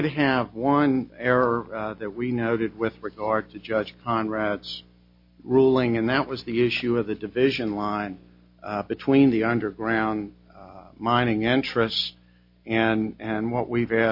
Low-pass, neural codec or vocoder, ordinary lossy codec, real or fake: 5.4 kHz; none; MP3, 32 kbps; real